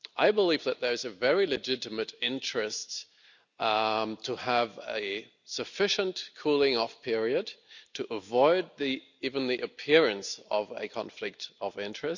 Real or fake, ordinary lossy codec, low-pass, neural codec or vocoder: real; none; 7.2 kHz; none